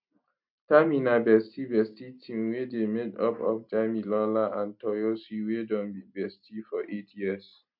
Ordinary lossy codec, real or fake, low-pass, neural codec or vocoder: MP3, 48 kbps; real; 5.4 kHz; none